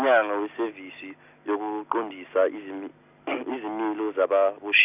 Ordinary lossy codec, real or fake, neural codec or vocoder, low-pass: none; real; none; 3.6 kHz